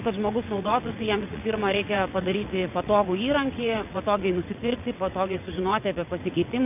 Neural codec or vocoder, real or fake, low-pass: vocoder, 22.05 kHz, 80 mel bands, WaveNeXt; fake; 3.6 kHz